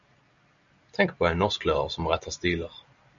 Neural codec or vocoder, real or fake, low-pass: none; real; 7.2 kHz